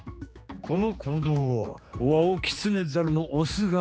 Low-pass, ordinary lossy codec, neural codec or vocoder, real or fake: none; none; codec, 16 kHz, 2 kbps, X-Codec, HuBERT features, trained on general audio; fake